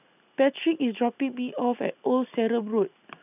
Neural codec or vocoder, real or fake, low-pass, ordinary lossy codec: none; real; 3.6 kHz; none